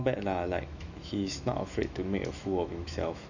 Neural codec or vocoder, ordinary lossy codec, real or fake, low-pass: autoencoder, 48 kHz, 128 numbers a frame, DAC-VAE, trained on Japanese speech; none; fake; 7.2 kHz